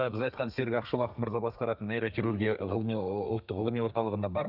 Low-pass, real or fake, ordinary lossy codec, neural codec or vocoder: 5.4 kHz; fake; none; codec, 32 kHz, 1.9 kbps, SNAC